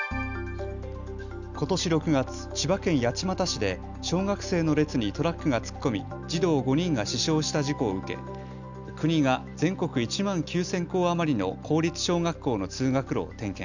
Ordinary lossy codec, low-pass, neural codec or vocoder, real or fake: none; 7.2 kHz; none; real